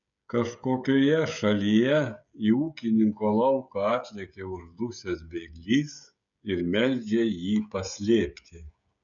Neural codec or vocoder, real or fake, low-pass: codec, 16 kHz, 16 kbps, FreqCodec, smaller model; fake; 7.2 kHz